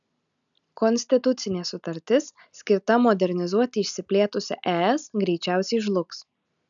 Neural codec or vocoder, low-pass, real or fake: none; 7.2 kHz; real